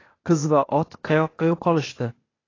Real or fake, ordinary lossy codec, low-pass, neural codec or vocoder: fake; AAC, 32 kbps; 7.2 kHz; codec, 16 kHz, 0.8 kbps, ZipCodec